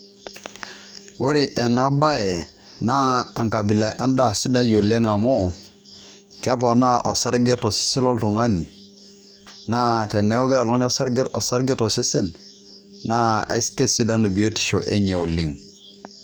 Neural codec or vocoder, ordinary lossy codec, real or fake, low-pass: codec, 44.1 kHz, 2.6 kbps, DAC; none; fake; none